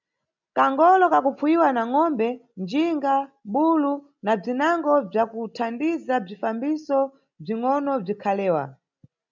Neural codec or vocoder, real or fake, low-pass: none; real; 7.2 kHz